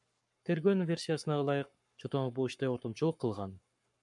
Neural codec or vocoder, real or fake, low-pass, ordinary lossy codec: codec, 44.1 kHz, 7.8 kbps, Pupu-Codec; fake; 10.8 kHz; MP3, 96 kbps